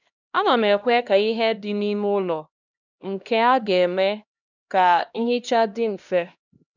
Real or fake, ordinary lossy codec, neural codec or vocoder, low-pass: fake; none; codec, 16 kHz, 1 kbps, X-Codec, HuBERT features, trained on LibriSpeech; 7.2 kHz